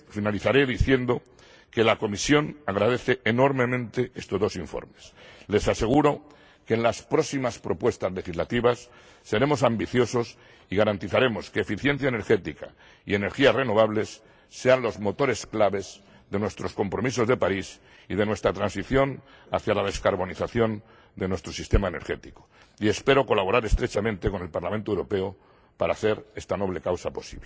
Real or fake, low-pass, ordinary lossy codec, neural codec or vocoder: real; none; none; none